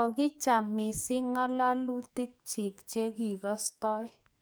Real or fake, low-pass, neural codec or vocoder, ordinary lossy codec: fake; none; codec, 44.1 kHz, 2.6 kbps, SNAC; none